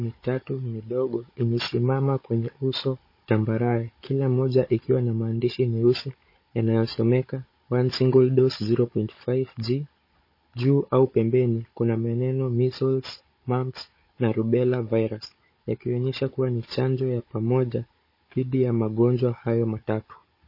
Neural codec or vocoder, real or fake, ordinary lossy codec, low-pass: codec, 16 kHz, 16 kbps, FunCodec, trained on Chinese and English, 50 frames a second; fake; MP3, 24 kbps; 5.4 kHz